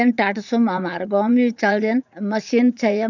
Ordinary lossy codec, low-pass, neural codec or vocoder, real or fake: none; 7.2 kHz; vocoder, 44.1 kHz, 80 mel bands, Vocos; fake